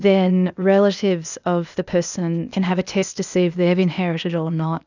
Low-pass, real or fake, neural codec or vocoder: 7.2 kHz; fake; codec, 16 kHz, 0.8 kbps, ZipCodec